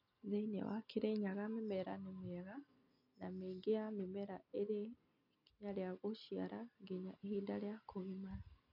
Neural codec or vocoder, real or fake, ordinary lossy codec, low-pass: none; real; none; 5.4 kHz